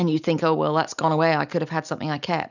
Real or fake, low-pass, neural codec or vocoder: real; 7.2 kHz; none